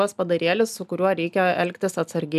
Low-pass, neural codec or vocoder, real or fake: 14.4 kHz; none; real